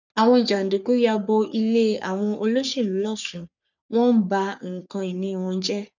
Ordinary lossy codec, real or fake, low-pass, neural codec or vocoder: none; fake; 7.2 kHz; codec, 44.1 kHz, 3.4 kbps, Pupu-Codec